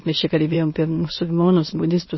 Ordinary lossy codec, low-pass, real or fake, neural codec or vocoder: MP3, 24 kbps; 7.2 kHz; fake; autoencoder, 22.05 kHz, a latent of 192 numbers a frame, VITS, trained on many speakers